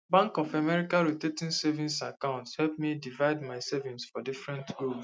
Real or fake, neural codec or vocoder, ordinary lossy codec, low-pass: real; none; none; none